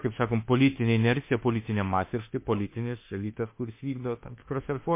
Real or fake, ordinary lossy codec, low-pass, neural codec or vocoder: fake; MP3, 24 kbps; 3.6 kHz; autoencoder, 48 kHz, 32 numbers a frame, DAC-VAE, trained on Japanese speech